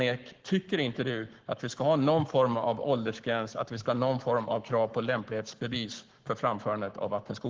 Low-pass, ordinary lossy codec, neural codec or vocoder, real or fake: 7.2 kHz; Opus, 16 kbps; codec, 44.1 kHz, 7.8 kbps, Pupu-Codec; fake